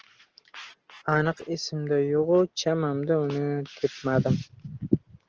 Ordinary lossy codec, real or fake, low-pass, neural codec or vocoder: Opus, 16 kbps; real; 7.2 kHz; none